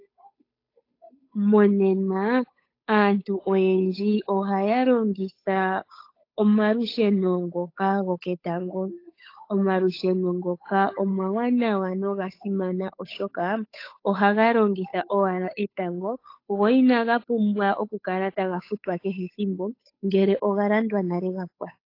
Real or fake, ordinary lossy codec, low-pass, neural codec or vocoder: fake; AAC, 32 kbps; 5.4 kHz; codec, 16 kHz, 8 kbps, FunCodec, trained on Chinese and English, 25 frames a second